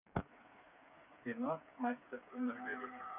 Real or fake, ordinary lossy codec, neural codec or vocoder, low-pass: fake; none; codec, 16 kHz, 4 kbps, FreqCodec, smaller model; 3.6 kHz